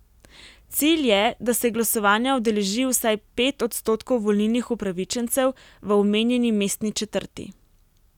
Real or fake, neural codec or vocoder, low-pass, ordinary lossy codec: real; none; 19.8 kHz; none